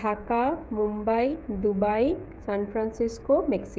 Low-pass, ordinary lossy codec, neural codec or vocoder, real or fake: none; none; codec, 16 kHz, 16 kbps, FreqCodec, smaller model; fake